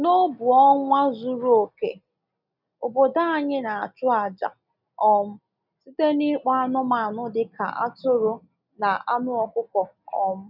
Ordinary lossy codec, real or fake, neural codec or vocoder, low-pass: none; real; none; 5.4 kHz